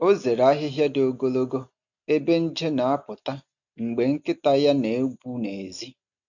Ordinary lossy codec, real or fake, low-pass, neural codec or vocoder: none; real; 7.2 kHz; none